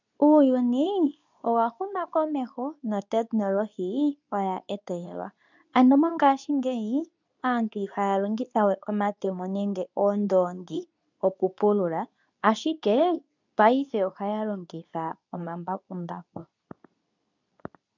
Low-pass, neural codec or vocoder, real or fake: 7.2 kHz; codec, 24 kHz, 0.9 kbps, WavTokenizer, medium speech release version 2; fake